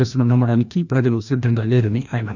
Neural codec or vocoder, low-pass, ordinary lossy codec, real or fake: codec, 16 kHz, 1 kbps, FreqCodec, larger model; 7.2 kHz; none; fake